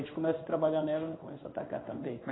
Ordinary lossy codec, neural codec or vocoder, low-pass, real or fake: AAC, 16 kbps; codec, 16 kHz in and 24 kHz out, 1 kbps, XY-Tokenizer; 7.2 kHz; fake